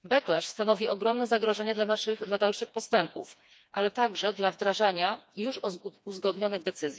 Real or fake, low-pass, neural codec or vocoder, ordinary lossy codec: fake; none; codec, 16 kHz, 2 kbps, FreqCodec, smaller model; none